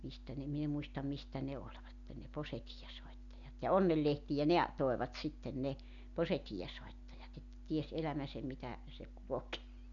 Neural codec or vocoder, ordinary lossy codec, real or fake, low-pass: none; none; real; 7.2 kHz